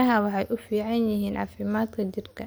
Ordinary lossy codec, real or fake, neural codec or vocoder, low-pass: none; real; none; none